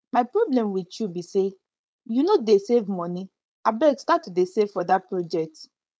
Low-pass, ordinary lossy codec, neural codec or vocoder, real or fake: none; none; codec, 16 kHz, 4.8 kbps, FACodec; fake